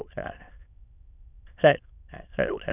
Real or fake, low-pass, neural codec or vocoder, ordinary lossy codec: fake; 3.6 kHz; autoencoder, 22.05 kHz, a latent of 192 numbers a frame, VITS, trained on many speakers; none